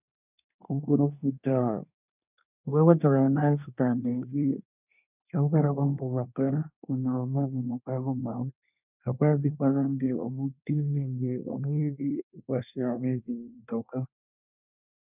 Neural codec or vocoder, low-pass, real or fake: codec, 24 kHz, 1 kbps, SNAC; 3.6 kHz; fake